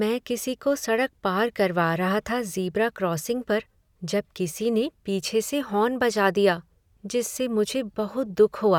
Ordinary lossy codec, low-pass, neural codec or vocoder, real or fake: none; 19.8 kHz; none; real